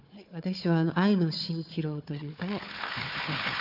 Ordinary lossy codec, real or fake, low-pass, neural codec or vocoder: none; fake; 5.4 kHz; codec, 16 kHz, 4 kbps, FunCodec, trained on Chinese and English, 50 frames a second